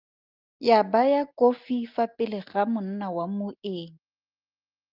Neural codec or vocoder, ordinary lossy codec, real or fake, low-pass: none; Opus, 32 kbps; real; 5.4 kHz